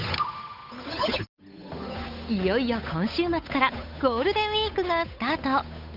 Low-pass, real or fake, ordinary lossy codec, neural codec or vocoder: 5.4 kHz; fake; none; codec, 16 kHz, 8 kbps, FunCodec, trained on Chinese and English, 25 frames a second